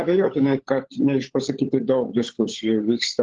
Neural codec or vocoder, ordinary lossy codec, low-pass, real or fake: codec, 16 kHz, 16 kbps, FunCodec, trained on LibriTTS, 50 frames a second; Opus, 16 kbps; 7.2 kHz; fake